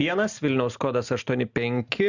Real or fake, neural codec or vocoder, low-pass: real; none; 7.2 kHz